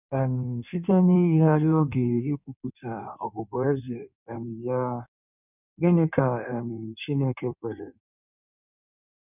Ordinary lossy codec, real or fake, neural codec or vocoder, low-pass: none; fake; codec, 16 kHz in and 24 kHz out, 1.1 kbps, FireRedTTS-2 codec; 3.6 kHz